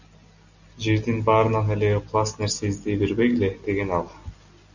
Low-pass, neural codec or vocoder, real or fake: 7.2 kHz; none; real